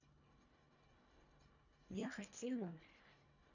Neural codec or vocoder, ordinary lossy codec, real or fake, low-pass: codec, 24 kHz, 1.5 kbps, HILCodec; none; fake; 7.2 kHz